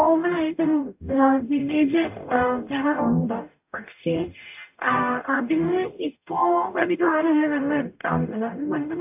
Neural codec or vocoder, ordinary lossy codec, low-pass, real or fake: codec, 44.1 kHz, 0.9 kbps, DAC; AAC, 32 kbps; 3.6 kHz; fake